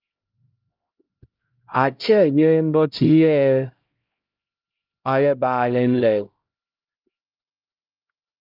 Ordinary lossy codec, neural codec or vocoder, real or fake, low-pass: Opus, 24 kbps; codec, 16 kHz, 0.5 kbps, X-Codec, HuBERT features, trained on LibriSpeech; fake; 5.4 kHz